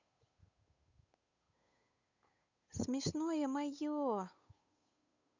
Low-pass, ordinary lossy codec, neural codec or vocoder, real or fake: 7.2 kHz; none; codec, 16 kHz, 8 kbps, FunCodec, trained on Chinese and English, 25 frames a second; fake